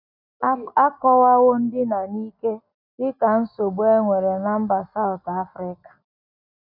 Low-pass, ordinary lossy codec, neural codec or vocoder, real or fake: 5.4 kHz; none; none; real